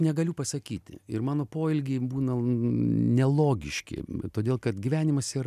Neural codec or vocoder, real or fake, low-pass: none; real; 14.4 kHz